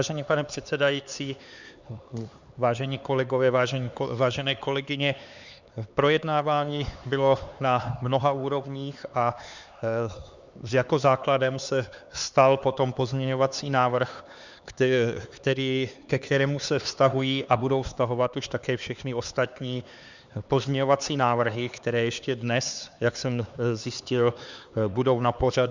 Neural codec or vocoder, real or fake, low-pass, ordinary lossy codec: codec, 16 kHz, 4 kbps, X-Codec, HuBERT features, trained on LibriSpeech; fake; 7.2 kHz; Opus, 64 kbps